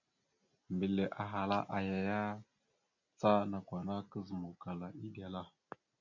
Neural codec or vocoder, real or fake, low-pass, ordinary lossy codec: none; real; 7.2 kHz; MP3, 64 kbps